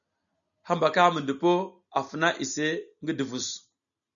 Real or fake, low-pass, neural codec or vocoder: real; 7.2 kHz; none